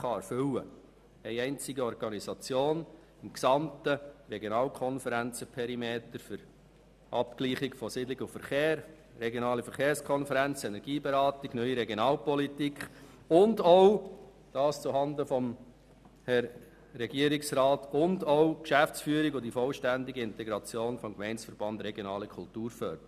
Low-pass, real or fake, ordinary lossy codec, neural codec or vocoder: 14.4 kHz; real; none; none